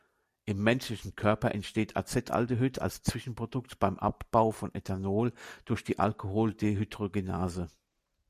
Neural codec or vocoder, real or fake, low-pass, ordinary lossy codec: none; real; 14.4 kHz; MP3, 96 kbps